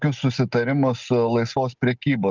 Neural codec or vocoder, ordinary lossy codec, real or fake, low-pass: none; Opus, 24 kbps; real; 7.2 kHz